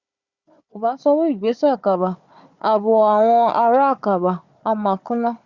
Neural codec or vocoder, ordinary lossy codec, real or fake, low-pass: codec, 16 kHz, 4 kbps, FunCodec, trained on Chinese and English, 50 frames a second; Opus, 64 kbps; fake; 7.2 kHz